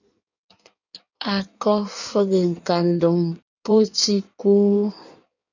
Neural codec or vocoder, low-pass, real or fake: codec, 16 kHz in and 24 kHz out, 1.1 kbps, FireRedTTS-2 codec; 7.2 kHz; fake